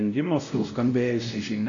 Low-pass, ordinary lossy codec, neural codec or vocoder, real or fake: 7.2 kHz; AAC, 48 kbps; codec, 16 kHz, 0.5 kbps, X-Codec, WavLM features, trained on Multilingual LibriSpeech; fake